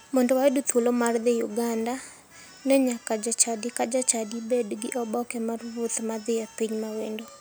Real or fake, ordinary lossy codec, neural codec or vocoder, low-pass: real; none; none; none